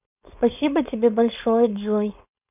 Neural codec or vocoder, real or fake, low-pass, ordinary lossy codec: codec, 16 kHz, 4.8 kbps, FACodec; fake; 3.6 kHz; none